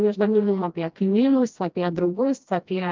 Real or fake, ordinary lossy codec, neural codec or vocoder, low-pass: fake; Opus, 24 kbps; codec, 16 kHz, 1 kbps, FreqCodec, smaller model; 7.2 kHz